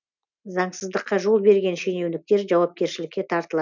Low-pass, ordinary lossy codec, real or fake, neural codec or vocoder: 7.2 kHz; none; real; none